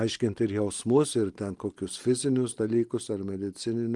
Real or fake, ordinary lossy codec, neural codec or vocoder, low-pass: real; Opus, 24 kbps; none; 10.8 kHz